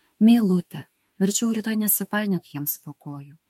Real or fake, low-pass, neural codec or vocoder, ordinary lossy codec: fake; 19.8 kHz; autoencoder, 48 kHz, 32 numbers a frame, DAC-VAE, trained on Japanese speech; MP3, 64 kbps